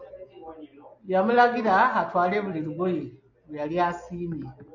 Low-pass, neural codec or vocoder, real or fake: 7.2 kHz; none; real